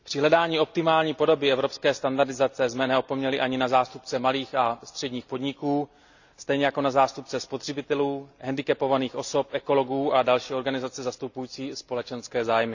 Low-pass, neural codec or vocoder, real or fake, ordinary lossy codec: 7.2 kHz; none; real; none